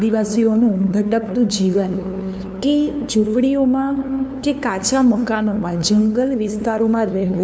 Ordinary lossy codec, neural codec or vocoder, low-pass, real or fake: none; codec, 16 kHz, 2 kbps, FunCodec, trained on LibriTTS, 25 frames a second; none; fake